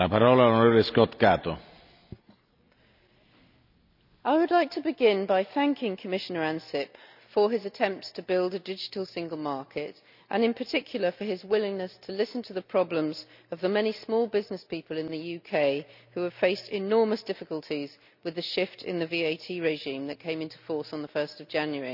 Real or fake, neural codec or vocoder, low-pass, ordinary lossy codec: real; none; 5.4 kHz; none